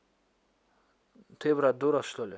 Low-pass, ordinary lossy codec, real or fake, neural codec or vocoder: none; none; real; none